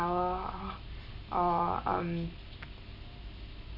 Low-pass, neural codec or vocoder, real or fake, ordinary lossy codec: 5.4 kHz; none; real; MP3, 32 kbps